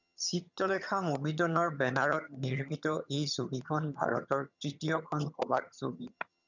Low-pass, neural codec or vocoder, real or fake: 7.2 kHz; vocoder, 22.05 kHz, 80 mel bands, HiFi-GAN; fake